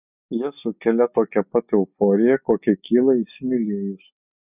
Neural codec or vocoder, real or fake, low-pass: none; real; 3.6 kHz